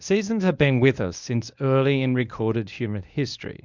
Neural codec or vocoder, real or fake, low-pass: codec, 24 kHz, 0.9 kbps, WavTokenizer, medium speech release version 1; fake; 7.2 kHz